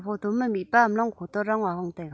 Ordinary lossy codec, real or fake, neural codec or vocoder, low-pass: none; real; none; none